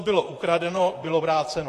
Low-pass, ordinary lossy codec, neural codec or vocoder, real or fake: 14.4 kHz; AAC, 48 kbps; vocoder, 44.1 kHz, 128 mel bands, Pupu-Vocoder; fake